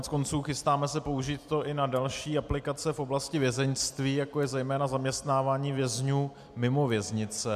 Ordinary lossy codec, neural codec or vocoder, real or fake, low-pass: MP3, 96 kbps; none; real; 14.4 kHz